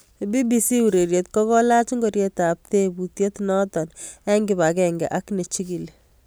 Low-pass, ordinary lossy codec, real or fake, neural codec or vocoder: none; none; real; none